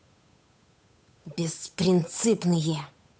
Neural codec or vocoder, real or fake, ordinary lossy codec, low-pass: codec, 16 kHz, 8 kbps, FunCodec, trained on Chinese and English, 25 frames a second; fake; none; none